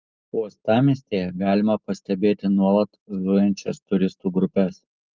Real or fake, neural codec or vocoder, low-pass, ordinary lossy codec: real; none; 7.2 kHz; Opus, 32 kbps